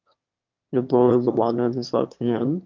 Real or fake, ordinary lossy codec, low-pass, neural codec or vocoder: fake; Opus, 32 kbps; 7.2 kHz; autoencoder, 22.05 kHz, a latent of 192 numbers a frame, VITS, trained on one speaker